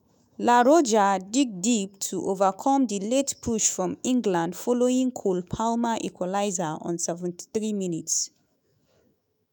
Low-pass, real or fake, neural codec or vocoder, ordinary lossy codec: none; fake; autoencoder, 48 kHz, 128 numbers a frame, DAC-VAE, trained on Japanese speech; none